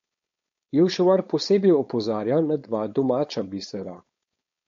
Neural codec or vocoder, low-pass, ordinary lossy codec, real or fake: codec, 16 kHz, 4.8 kbps, FACodec; 7.2 kHz; MP3, 48 kbps; fake